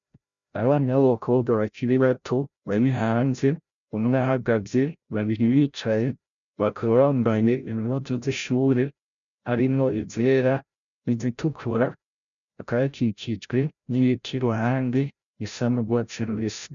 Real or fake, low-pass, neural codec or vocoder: fake; 7.2 kHz; codec, 16 kHz, 0.5 kbps, FreqCodec, larger model